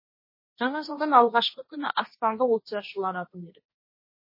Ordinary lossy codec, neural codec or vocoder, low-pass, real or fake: MP3, 24 kbps; codec, 16 kHz, 1.1 kbps, Voila-Tokenizer; 5.4 kHz; fake